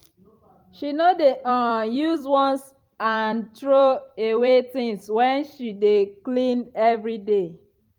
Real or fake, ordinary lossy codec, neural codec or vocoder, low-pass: fake; Opus, 32 kbps; vocoder, 44.1 kHz, 128 mel bands every 256 samples, BigVGAN v2; 19.8 kHz